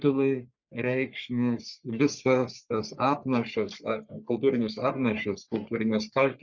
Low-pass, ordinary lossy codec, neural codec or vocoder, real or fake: 7.2 kHz; Opus, 64 kbps; codec, 44.1 kHz, 3.4 kbps, Pupu-Codec; fake